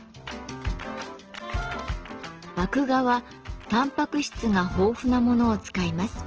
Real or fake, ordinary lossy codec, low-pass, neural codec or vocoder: real; Opus, 16 kbps; 7.2 kHz; none